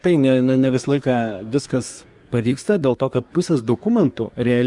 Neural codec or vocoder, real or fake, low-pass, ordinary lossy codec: codec, 24 kHz, 1 kbps, SNAC; fake; 10.8 kHz; Opus, 64 kbps